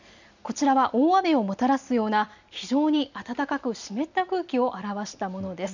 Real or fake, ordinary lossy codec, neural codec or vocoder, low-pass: real; none; none; 7.2 kHz